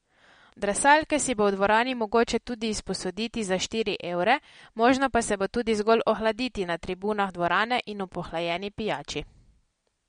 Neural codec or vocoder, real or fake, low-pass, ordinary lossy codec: none; real; 9.9 kHz; MP3, 48 kbps